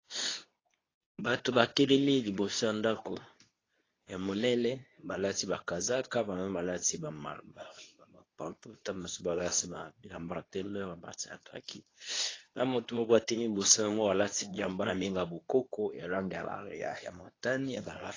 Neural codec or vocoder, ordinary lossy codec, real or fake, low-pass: codec, 24 kHz, 0.9 kbps, WavTokenizer, medium speech release version 2; AAC, 32 kbps; fake; 7.2 kHz